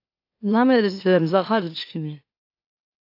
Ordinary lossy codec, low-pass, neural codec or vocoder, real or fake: AAC, 32 kbps; 5.4 kHz; autoencoder, 44.1 kHz, a latent of 192 numbers a frame, MeloTTS; fake